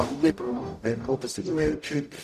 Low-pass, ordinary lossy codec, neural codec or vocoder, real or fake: 14.4 kHz; none; codec, 44.1 kHz, 0.9 kbps, DAC; fake